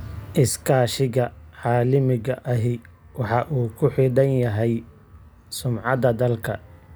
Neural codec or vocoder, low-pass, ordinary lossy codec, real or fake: none; none; none; real